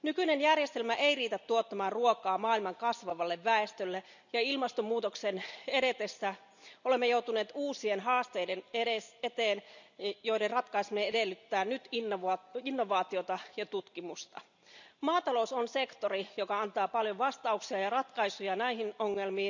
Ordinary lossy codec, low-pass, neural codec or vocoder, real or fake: none; 7.2 kHz; none; real